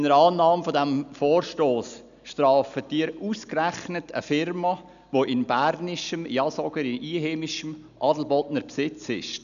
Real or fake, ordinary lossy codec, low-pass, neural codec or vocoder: real; none; 7.2 kHz; none